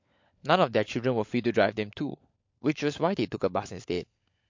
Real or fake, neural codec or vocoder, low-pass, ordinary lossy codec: fake; codec, 16 kHz, 16 kbps, FunCodec, trained on LibriTTS, 50 frames a second; 7.2 kHz; MP3, 48 kbps